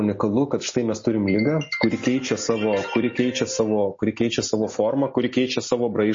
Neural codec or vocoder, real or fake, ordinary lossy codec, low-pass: none; real; MP3, 32 kbps; 7.2 kHz